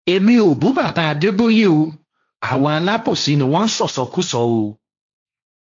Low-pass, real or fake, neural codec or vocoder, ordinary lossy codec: 7.2 kHz; fake; codec, 16 kHz, 1.1 kbps, Voila-Tokenizer; AAC, 64 kbps